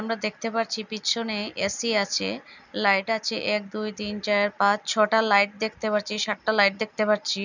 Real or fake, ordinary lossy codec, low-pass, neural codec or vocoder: real; none; 7.2 kHz; none